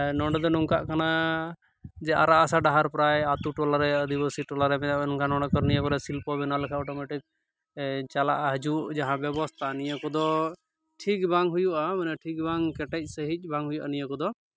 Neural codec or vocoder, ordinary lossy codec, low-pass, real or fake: none; none; none; real